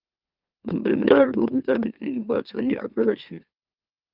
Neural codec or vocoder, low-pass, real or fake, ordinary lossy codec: autoencoder, 44.1 kHz, a latent of 192 numbers a frame, MeloTTS; 5.4 kHz; fake; Opus, 24 kbps